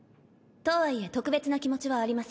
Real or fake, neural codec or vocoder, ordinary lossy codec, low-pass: real; none; none; none